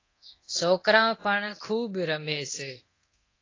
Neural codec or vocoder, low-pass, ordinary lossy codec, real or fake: codec, 24 kHz, 0.9 kbps, DualCodec; 7.2 kHz; AAC, 32 kbps; fake